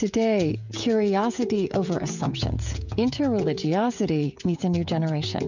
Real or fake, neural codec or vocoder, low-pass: fake; codec, 16 kHz, 16 kbps, FreqCodec, smaller model; 7.2 kHz